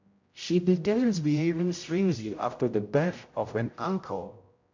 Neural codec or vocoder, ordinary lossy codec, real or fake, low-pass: codec, 16 kHz, 0.5 kbps, X-Codec, HuBERT features, trained on general audio; MP3, 48 kbps; fake; 7.2 kHz